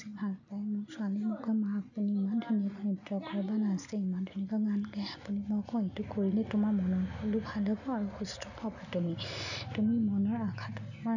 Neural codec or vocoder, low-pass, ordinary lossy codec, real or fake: none; 7.2 kHz; none; real